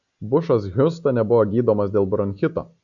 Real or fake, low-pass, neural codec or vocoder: real; 7.2 kHz; none